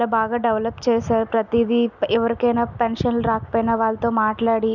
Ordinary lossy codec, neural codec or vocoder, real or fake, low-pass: none; none; real; 7.2 kHz